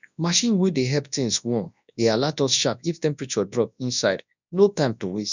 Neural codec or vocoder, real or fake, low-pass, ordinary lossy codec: codec, 24 kHz, 0.9 kbps, WavTokenizer, large speech release; fake; 7.2 kHz; none